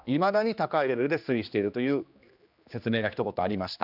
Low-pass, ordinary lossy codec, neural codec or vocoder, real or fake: 5.4 kHz; none; codec, 16 kHz, 2 kbps, X-Codec, HuBERT features, trained on general audio; fake